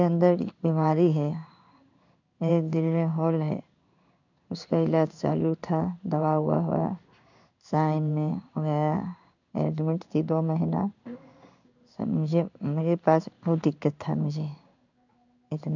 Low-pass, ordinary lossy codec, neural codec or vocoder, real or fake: 7.2 kHz; none; codec, 16 kHz in and 24 kHz out, 1 kbps, XY-Tokenizer; fake